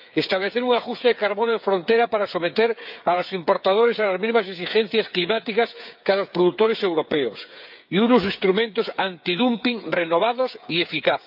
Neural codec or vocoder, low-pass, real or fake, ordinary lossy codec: codec, 16 kHz, 8 kbps, FreqCodec, smaller model; 5.4 kHz; fake; none